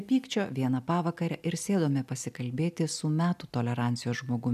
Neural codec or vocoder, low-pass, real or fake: none; 14.4 kHz; real